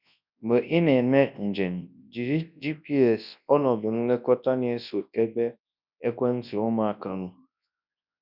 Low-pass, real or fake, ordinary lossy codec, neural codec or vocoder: 5.4 kHz; fake; none; codec, 24 kHz, 0.9 kbps, WavTokenizer, large speech release